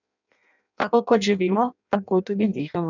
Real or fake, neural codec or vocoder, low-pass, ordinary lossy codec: fake; codec, 16 kHz in and 24 kHz out, 0.6 kbps, FireRedTTS-2 codec; 7.2 kHz; none